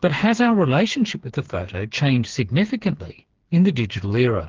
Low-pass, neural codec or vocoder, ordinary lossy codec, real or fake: 7.2 kHz; codec, 16 kHz, 4 kbps, FreqCodec, smaller model; Opus, 16 kbps; fake